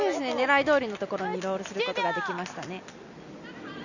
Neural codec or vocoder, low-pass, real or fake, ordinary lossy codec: none; 7.2 kHz; real; none